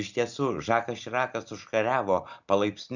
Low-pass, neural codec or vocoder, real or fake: 7.2 kHz; none; real